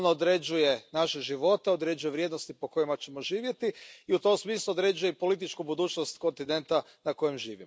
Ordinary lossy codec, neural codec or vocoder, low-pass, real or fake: none; none; none; real